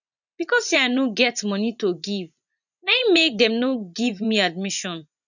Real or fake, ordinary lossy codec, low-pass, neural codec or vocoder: fake; none; 7.2 kHz; vocoder, 24 kHz, 100 mel bands, Vocos